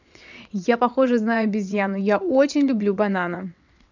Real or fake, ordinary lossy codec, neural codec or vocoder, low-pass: fake; none; vocoder, 22.05 kHz, 80 mel bands, WaveNeXt; 7.2 kHz